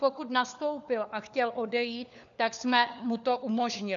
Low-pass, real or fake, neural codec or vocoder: 7.2 kHz; fake; codec, 16 kHz, 4 kbps, FunCodec, trained on LibriTTS, 50 frames a second